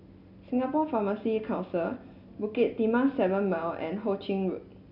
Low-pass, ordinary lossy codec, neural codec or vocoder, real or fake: 5.4 kHz; none; none; real